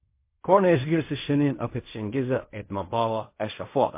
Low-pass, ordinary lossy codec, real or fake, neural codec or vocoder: 3.6 kHz; MP3, 24 kbps; fake; codec, 16 kHz in and 24 kHz out, 0.4 kbps, LongCat-Audio-Codec, fine tuned four codebook decoder